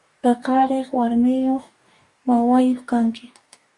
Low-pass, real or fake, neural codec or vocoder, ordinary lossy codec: 10.8 kHz; fake; codec, 44.1 kHz, 2.6 kbps, DAC; Opus, 64 kbps